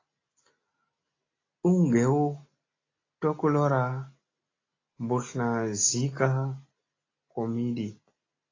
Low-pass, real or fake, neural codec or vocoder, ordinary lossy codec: 7.2 kHz; real; none; AAC, 32 kbps